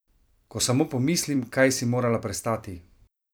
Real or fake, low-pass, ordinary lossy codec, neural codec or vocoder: fake; none; none; vocoder, 44.1 kHz, 128 mel bands every 256 samples, BigVGAN v2